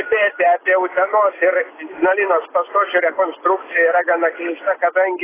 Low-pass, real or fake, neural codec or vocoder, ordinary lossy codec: 3.6 kHz; real; none; AAC, 16 kbps